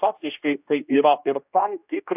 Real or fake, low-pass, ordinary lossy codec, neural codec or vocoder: fake; 3.6 kHz; AAC, 32 kbps; codec, 16 kHz, 1 kbps, X-Codec, HuBERT features, trained on general audio